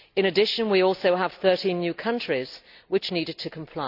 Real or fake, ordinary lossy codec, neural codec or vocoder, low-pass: real; none; none; 5.4 kHz